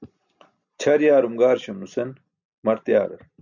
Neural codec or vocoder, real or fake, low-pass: none; real; 7.2 kHz